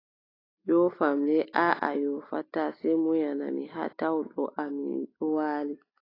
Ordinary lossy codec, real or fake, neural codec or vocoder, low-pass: AAC, 24 kbps; real; none; 5.4 kHz